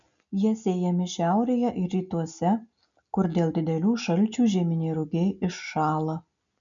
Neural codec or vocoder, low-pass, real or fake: none; 7.2 kHz; real